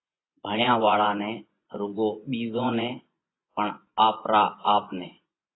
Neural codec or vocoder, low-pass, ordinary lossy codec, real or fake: vocoder, 24 kHz, 100 mel bands, Vocos; 7.2 kHz; AAC, 16 kbps; fake